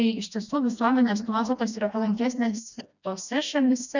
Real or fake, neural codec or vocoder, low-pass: fake; codec, 16 kHz, 1 kbps, FreqCodec, smaller model; 7.2 kHz